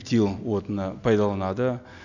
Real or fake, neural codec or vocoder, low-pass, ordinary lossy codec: real; none; 7.2 kHz; none